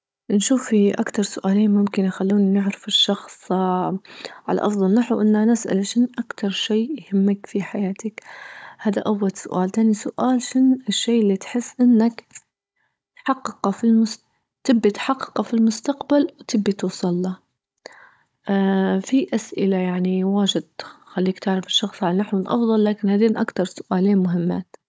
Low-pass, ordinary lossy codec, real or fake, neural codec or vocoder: none; none; fake; codec, 16 kHz, 16 kbps, FunCodec, trained on Chinese and English, 50 frames a second